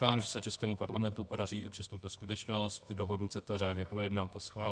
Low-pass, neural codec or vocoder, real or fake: 9.9 kHz; codec, 24 kHz, 0.9 kbps, WavTokenizer, medium music audio release; fake